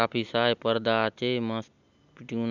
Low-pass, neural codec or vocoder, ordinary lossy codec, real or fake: 7.2 kHz; none; none; real